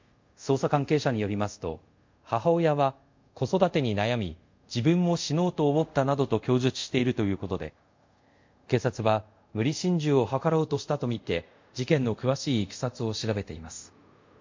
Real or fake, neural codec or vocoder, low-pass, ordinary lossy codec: fake; codec, 24 kHz, 0.5 kbps, DualCodec; 7.2 kHz; MP3, 48 kbps